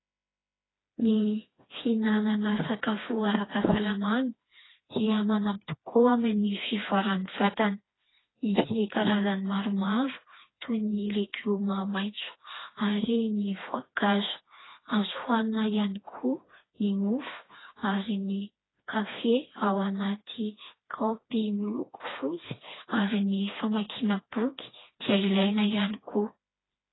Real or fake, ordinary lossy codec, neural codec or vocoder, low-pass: fake; AAC, 16 kbps; codec, 16 kHz, 2 kbps, FreqCodec, smaller model; 7.2 kHz